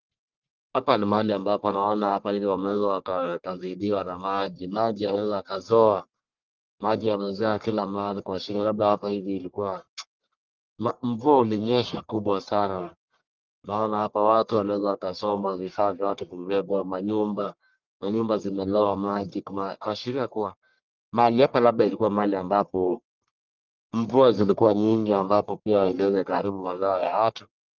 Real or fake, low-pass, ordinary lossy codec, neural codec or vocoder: fake; 7.2 kHz; Opus, 24 kbps; codec, 44.1 kHz, 1.7 kbps, Pupu-Codec